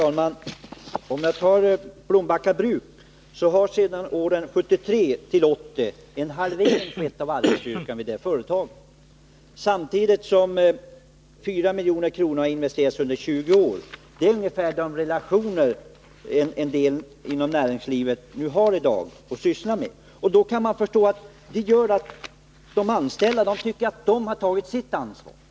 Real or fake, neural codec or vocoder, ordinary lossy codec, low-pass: real; none; none; none